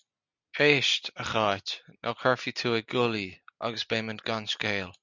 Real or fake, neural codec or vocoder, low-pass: real; none; 7.2 kHz